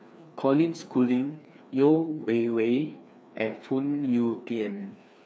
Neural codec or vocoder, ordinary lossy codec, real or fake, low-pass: codec, 16 kHz, 2 kbps, FreqCodec, larger model; none; fake; none